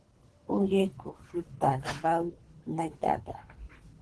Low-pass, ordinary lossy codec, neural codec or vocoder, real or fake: 10.8 kHz; Opus, 16 kbps; codec, 24 kHz, 3 kbps, HILCodec; fake